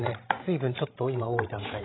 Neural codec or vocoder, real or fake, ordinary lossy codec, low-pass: codec, 16 kHz, 16 kbps, FreqCodec, larger model; fake; AAC, 16 kbps; 7.2 kHz